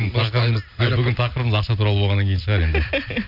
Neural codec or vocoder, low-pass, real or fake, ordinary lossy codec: none; 5.4 kHz; real; none